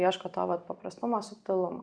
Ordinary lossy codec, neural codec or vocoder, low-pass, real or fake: Opus, 64 kbps; none; 9.9 kHz; real